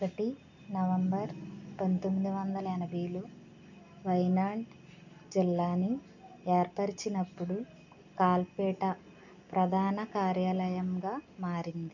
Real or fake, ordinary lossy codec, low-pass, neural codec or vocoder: real; none; 7.2 kHz; none